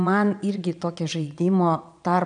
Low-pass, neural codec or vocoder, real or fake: 9.9 kHz; vocoder, 22.05 kHz, 80 mel bands, Vocos; fake